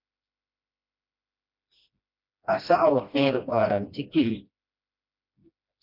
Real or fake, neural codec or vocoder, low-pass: fake; codec, 16 kHz, 1 kbps, FreqCodec, smaller model; 5.4 kHz